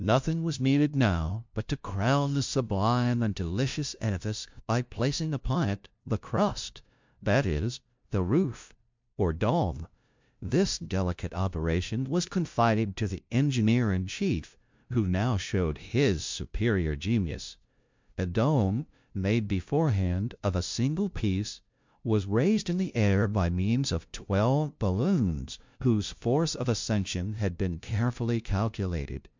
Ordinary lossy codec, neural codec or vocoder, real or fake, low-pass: MP3, 64 kbps; codec, 16 kHz, 0.5 kbps, FunCodec, trained on LibriTTS, 25 frames a second; fake; 7.2 kHz